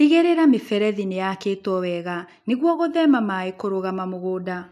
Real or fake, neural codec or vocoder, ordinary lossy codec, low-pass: real; none; none; 14.4 kHz